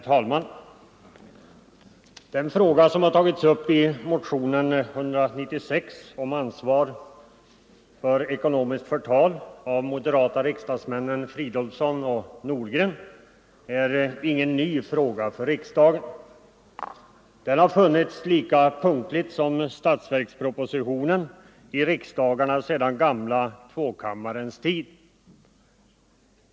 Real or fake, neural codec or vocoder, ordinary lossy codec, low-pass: real; none; none; none